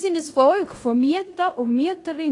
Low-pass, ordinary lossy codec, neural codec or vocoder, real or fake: 10.8 kHz; AAC, 48 kbps; codec, 16 kHz in and 24 kHz out, 0.9 kbps, LongCat-Audio-Codec, fine tuned four codebook decoder; fake